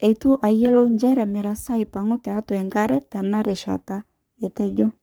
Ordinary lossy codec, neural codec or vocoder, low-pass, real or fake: none; codec, 44.1 kHz, 3.4 kbps, Pupu-Codec; none; fake